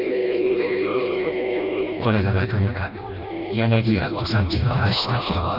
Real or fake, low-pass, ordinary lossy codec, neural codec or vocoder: fake; 5.4 kHz; none; codec, 16 kHz, 1 kbps, FreqCodec, smaller model